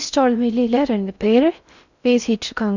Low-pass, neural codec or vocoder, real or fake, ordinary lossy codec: 7.2 kHz; codec, 16 kHz in and 24 kHz out, 0.6 kbps, FocalCodec, streaming, 4096 codes; fake; none